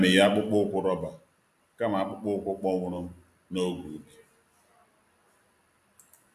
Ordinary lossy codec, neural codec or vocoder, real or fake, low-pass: AAC, 96 kbps; none; real; 14.4 kHz